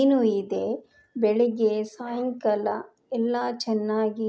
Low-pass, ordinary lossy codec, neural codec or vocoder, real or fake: none; none; none; real